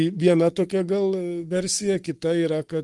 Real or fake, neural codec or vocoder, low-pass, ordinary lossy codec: fake; codec, 44.1 kHz, 7.8 kbps, Pupu-Codec; 10.8 kHz; Opus, 24 kbps